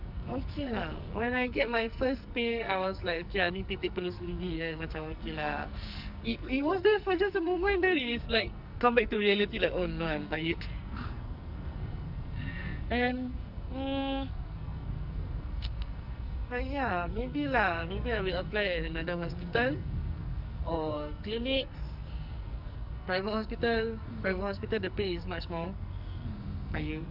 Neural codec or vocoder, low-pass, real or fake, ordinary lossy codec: codec, 44.1 kHz, 2.6 kbps, SNAC; 5.4 kHz; fake; none